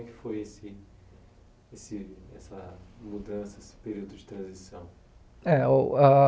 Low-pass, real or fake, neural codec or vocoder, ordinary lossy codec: none; real; none; none